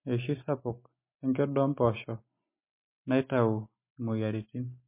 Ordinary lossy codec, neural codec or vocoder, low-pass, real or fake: MP3, 24 kbps; none; 3.6 kHz; real